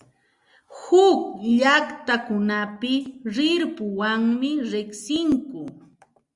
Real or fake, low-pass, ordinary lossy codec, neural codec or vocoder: real; 10.8 kHz; Opus, 64 kbps; none